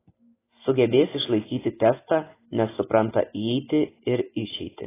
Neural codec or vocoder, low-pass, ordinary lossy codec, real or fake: none; 3.6 kHz; AAC, 16 kbps; real